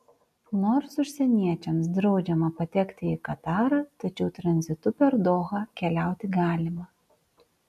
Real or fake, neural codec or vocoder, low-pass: real; none; 14.4 kHz